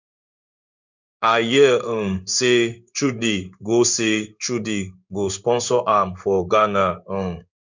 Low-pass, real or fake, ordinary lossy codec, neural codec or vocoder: 7.2 kHz; fake; none; codec, 16 kHz in and 24 kHz out, 1 kbps, XY-Tokenizer